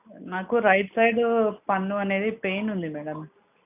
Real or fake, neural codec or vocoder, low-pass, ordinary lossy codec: real; none; 3.6 kHz; none